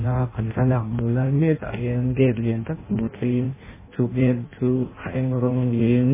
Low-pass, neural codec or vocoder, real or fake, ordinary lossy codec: 3.6 kHz; codec, 16 kHz in and 24 kHz out, 0.6 kbps, FireRedTTS-2 codec; fake; MP3, 16 kbps